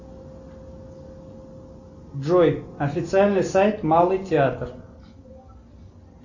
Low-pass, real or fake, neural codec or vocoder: 7.2 kHz; real; none